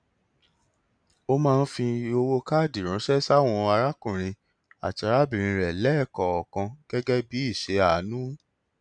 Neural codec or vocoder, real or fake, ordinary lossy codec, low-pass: none; real; none; 9.9 kHz